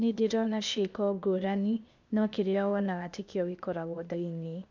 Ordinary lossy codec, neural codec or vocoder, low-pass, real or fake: none; codec, 16 kHz, 0.8 kbps, ZipCodec; 7.2 kHz; fake